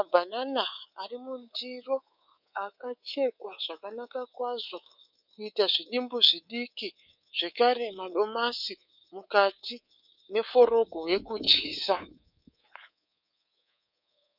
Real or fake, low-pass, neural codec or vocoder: fake; 5.4 kHz; codec, 24 kHz, 3.1 kbps, DualCodec